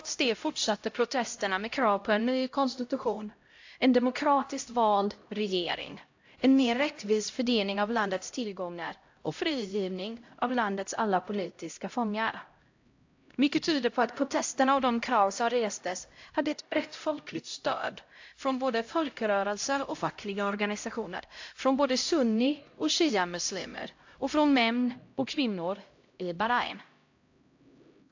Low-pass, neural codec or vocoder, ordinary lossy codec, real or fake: 7.2 kHz; codec, 16 kHz, 0.5 kbps, X-Codec, HuBERT features, trained on LibriSpeech; AAC, 48 kbps; fake